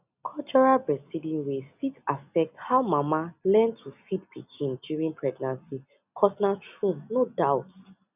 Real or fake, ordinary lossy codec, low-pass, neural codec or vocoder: real; none; 3.6 kHz; none